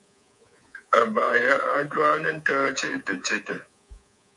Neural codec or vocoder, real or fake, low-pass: codec, 24 kHz, 3.1 kbps, DualCodec; fake; 10.8 kHz